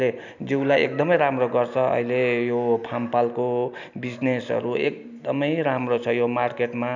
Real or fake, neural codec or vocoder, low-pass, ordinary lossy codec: real; none; 7.2 kHz; none